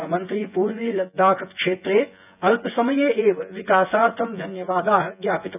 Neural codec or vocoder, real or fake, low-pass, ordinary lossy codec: vocoder, 24 kHz, 100 mel bands, Vocos; fake; 3.6 kHz; none